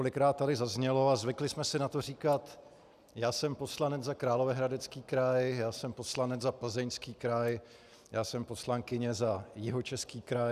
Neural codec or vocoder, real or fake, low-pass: none; real; 14.4 kHz